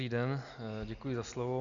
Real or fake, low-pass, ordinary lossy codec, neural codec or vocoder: real; 7.2 kHz; Opus, 64 kbps; none